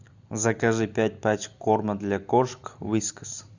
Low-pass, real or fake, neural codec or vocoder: 7.2 kHz; real; none